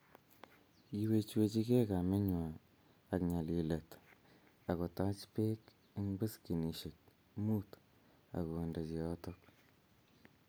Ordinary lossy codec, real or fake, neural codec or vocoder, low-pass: none; real; none; none